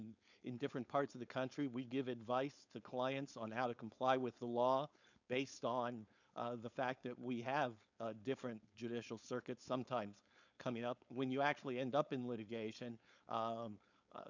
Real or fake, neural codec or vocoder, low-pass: fake; codec, 16 kHz, 4.8 kbps, FACodec; 7.2 kHz